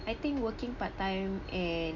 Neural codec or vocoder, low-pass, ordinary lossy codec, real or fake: none; 7.2 kHz; none; real